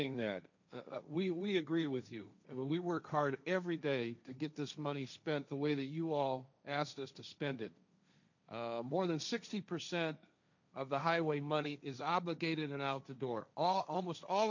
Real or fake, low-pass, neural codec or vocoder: fake; 7.2 kHz; codec, 16 kHz, 1.1 kbps, Voila-Tokenizer